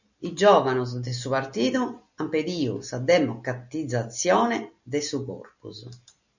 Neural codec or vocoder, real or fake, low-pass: none; real; 7.2 kHz